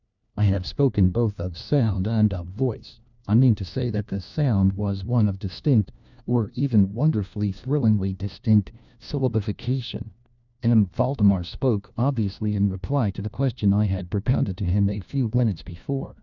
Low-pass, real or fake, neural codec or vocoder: 7.2 kHz; fake; codec, 16 kHz, 1 kbps, FunCodec, trained on LibriTTS, 50 frames a second